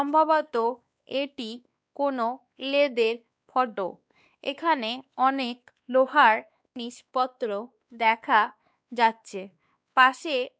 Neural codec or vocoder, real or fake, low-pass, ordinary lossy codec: codec, 16 kHz, 2 kbps, X-Codec, WavLM features, trained on Multilingual LibriSpeech; fake; none; none